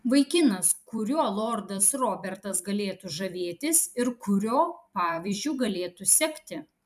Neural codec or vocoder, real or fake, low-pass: vocoder, 44.1 kHz, 128 mel bands every 256 samples, BigVGAN v2; fake; 14.4 kHz